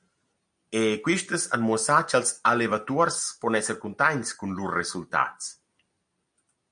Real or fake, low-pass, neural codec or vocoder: real; 9.9 kHz; none